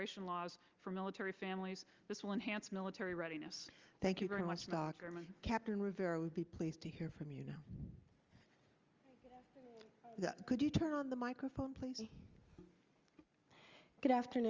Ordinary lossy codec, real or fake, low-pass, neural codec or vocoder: Opus, 32 kbps; real; 7.2 kHz; none